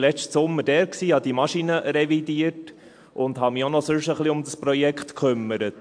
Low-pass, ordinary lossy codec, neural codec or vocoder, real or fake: 9.9 kHz; MP3, 64 kbps; none; real